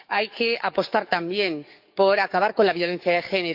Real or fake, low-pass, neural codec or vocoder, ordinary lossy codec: fake; 5.4 kHz; codec, 44.1 kHz, 7.8 kbps, DAC; none